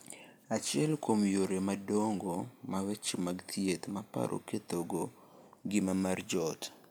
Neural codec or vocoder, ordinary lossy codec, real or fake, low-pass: none; none; real; none